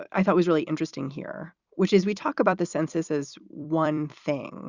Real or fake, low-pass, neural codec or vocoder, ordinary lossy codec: fake; 7.2 kHz; vocoder, 44.1 kHz, 128 mel bands every 256 samples, BigVGAN v2; Opus, 64 kbps